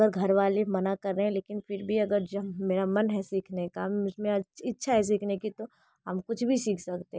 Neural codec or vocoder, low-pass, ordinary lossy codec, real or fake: none; none; none; real